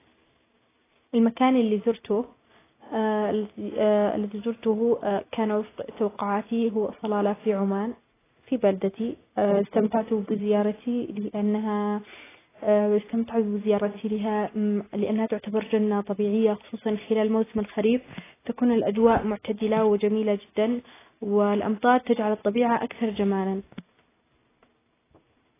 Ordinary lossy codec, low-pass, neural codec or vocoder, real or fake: AAC, 16 kbps; 3.6 kHz; none; real